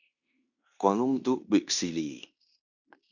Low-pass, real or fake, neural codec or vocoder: 7.2 kHz; fake; codec, 16 kHz in and 24 kHz out, 0.9 kbps, LongCat-Audio-Codec, fine tuned four codebook decoder